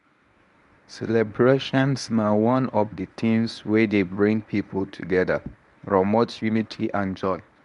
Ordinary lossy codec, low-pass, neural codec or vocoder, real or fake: none; 10.8 kHz; codec, 24 kHz, 0.9 kbps, WavTokenizer, medium speech release version 1; fake